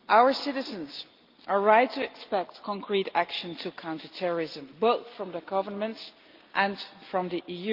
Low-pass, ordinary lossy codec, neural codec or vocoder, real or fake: 5.4 kHz; Opus, 32 kbps; none; real